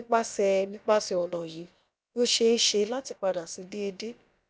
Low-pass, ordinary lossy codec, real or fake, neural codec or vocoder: none; none; fake; codec, 16 kHz, about 1 kbps, DyCAST, with the encoder's durations